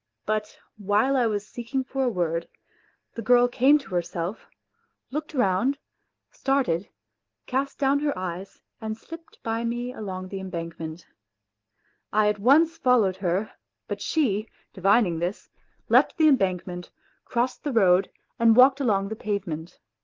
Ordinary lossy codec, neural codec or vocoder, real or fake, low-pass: Opus, 16 kbps; none; real; 7.2 kHz